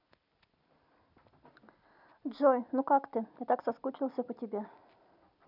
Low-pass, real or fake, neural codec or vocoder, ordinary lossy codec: 5.4 kHz; real; none; none